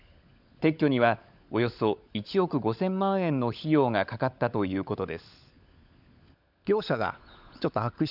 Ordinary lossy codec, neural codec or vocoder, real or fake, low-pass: none; codec, 16 kHz, 16 kbps, FunCodec, trained on LibriTTS, 50 frames a second; fake; 5.4 kHz